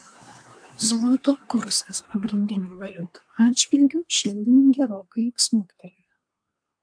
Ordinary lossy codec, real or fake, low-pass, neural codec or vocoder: AAC, 64 kbps; fake; 9.9 kHz; codec, 24 kHz, 1 kbps, SNAC